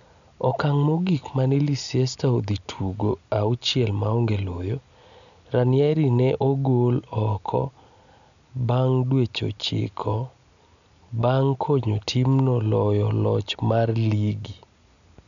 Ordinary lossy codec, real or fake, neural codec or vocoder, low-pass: none; real; none; 7.2 kHz